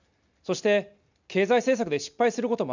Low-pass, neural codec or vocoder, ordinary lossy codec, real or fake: 7.2 kHz; none; none; real